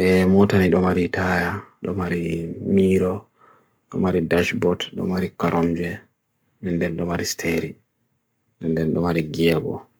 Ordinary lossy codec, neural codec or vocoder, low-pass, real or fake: none; codec, 44.1 kHz, 7.8 kbps, Pupu-Codec; none; fake